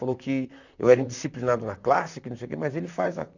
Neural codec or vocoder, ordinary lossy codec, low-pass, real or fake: vocoder, 44.1 kHz, 128 mel bands, Pupu-Vocoder; none; 7.2 kHz; fake